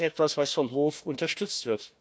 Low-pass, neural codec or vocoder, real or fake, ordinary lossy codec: none; codec, 16 kHz, 1 kbps, FunCodec, trained on Chinese and English, 50 frames a second; fake; none